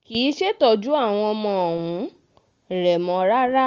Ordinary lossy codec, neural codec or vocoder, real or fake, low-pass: Opus, 32 kbps; none; real; 7.2 kHz